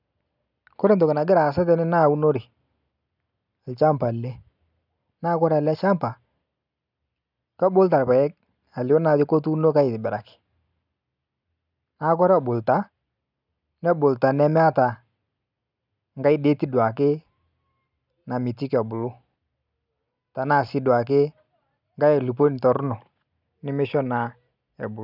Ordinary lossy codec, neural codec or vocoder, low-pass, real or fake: none; none; 5.4 kHz; real